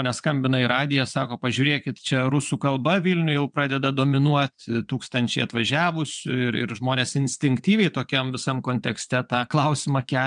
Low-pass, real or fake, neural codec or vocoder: 9.9 kHz; fake; vocoder, 22.05 kHz, 80 mel bands, WaveNeXt